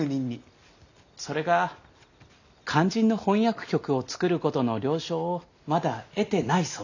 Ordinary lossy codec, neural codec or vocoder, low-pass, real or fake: none; none; 7.2 kHz; real